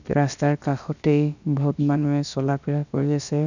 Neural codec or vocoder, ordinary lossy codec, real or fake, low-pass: codec, 16 kHz, about 1 kbps, DyCAST, with the encoder's durations; none; fake; 7.2 kHz